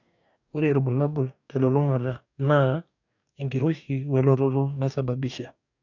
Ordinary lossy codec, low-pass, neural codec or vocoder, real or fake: none; 7.2 kHz; codec, 44.1 kHz, 2.6 kbps, DAC; fake